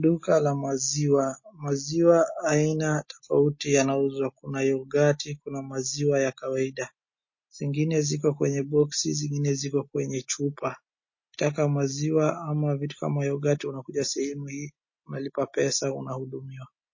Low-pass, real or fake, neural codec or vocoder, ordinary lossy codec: 7.2 kHz; real; none; MP3, 32 kbps